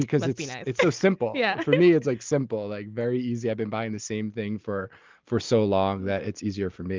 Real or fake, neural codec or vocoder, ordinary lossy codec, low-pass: real; none; Opus, 24 kbps; 7.2 kHz